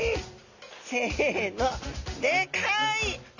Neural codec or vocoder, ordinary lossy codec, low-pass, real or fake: none; none; 7.2 kHz; real